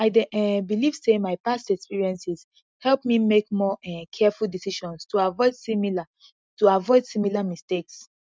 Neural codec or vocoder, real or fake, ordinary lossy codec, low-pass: none; real; none; none